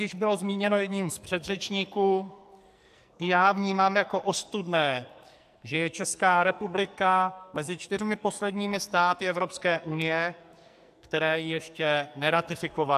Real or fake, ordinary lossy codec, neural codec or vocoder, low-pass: fake; AAC, 96 kbps; codec, 44.1 kHz, 2.6 kbps, SNAC; 14.4 kHz